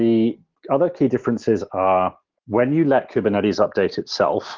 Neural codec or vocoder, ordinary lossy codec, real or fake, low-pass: none; Opus, 16 kbps; real; 7.2 kHz